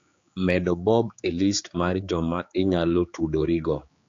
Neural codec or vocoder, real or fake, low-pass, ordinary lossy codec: codec, 16 kHz, 4 kbps, X-Codec, HuBERT features, trained on general audio; fake; 7.2 kHz; AAC, 48 kbps